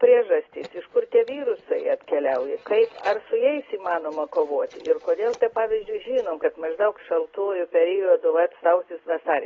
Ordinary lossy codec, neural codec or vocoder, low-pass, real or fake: AAC, 24 kbps; none; 7.2 kHz; real